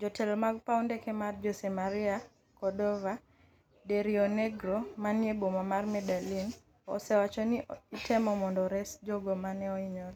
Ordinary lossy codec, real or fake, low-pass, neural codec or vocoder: none; real; 19.8 kHz; none